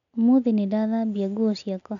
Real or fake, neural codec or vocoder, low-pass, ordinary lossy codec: real; none; 7.2 kHz; none